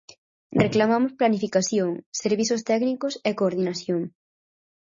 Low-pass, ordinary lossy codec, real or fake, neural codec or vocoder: 7.2 kHz; MP3, 32 kbps; real; none